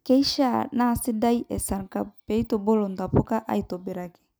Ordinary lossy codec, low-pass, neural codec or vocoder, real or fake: none; none; none; real